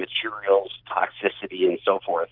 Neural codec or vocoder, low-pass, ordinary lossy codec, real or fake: none; 5.4 kHz; Opus, 24 kbps; real